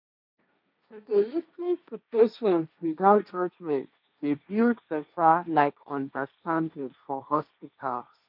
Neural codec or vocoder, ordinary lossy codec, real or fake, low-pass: codec, 16 kHz, 1.1 kbps, Voila-Tokenizer; none; fake; 5.4 kHz